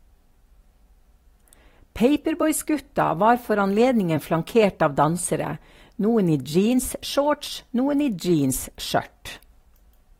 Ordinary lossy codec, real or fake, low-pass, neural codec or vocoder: AAC, 48 kbps; fake; 19.8 kHz; vocoder, 44.1 kHz, 128 mel bands every 256 samples, BigVGAN v2